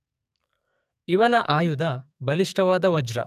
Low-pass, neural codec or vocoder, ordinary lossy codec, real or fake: 14.4 kHz; codec, 44.1 kHz, 2.6 kbps, SNAC; none; fake